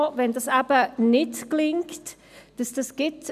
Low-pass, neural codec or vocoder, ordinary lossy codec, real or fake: 14.4 kHz; none; none; real